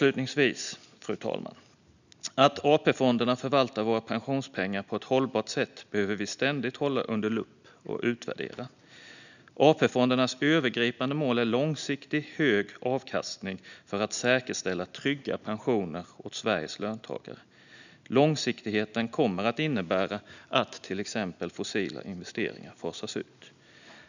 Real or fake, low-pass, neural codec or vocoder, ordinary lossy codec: real; 7.2 kHz; none; none